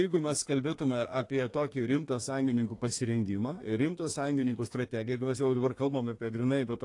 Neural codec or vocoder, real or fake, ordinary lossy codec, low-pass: codec, 32 kHz, 1.9 kbps, SNAC; fake; AAC, 48 kbps; 10.8 kHz